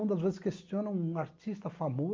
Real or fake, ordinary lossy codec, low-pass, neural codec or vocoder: real; Opus, 32 kbps; 7.2 kHz; none